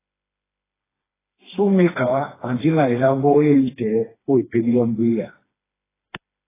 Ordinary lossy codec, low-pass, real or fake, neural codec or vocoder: AAC, 16 kbps; 3.6 kHz; fake; codec, 16 kHz, 2 kbps, FreqCodec, smaller model